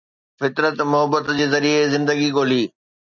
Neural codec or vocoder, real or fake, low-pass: none; real; 7.2 kHz